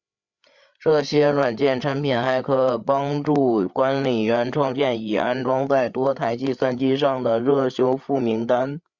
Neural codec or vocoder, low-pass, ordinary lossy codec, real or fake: codec, 16 kHz, 8 kbps, FreqCodec, larger model; 7.2 kHz; Opus, 64 kbps; fake